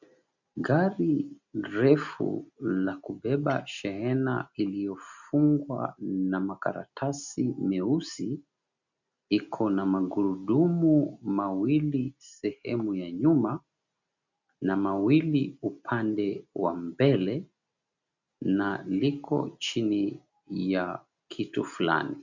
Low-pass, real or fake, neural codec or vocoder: 7.2 kHz; real; none